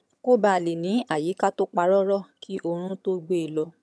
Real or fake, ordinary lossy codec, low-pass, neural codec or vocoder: fake; none; none; vocoder, 22.05 kHz, 80 mel bands, HiFi-GAN